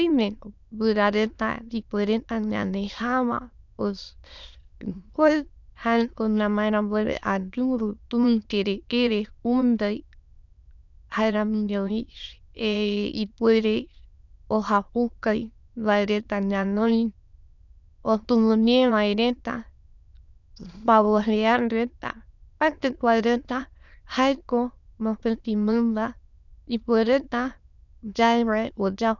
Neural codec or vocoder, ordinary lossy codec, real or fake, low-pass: autoencoder, 22.05 kHz, a latent of 192 numbers a frame, VITS, trained on many speakers; none; fake; 7.2 kHz